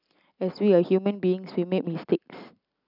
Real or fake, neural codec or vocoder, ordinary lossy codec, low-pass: real; none; none; 5.4 kHz